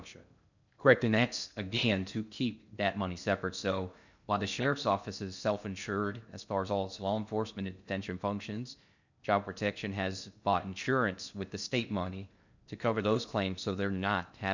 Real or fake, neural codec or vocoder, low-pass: fake; codec, 16 kHz in and 24 kHz out, 0.6 kbps, FocalCodec, streaming, 2048 codes; 7.2 kHz